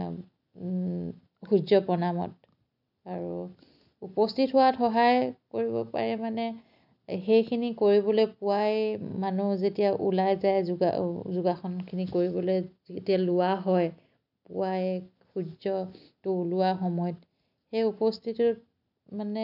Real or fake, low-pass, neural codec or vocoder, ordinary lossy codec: real; 5.4 kHz; none; none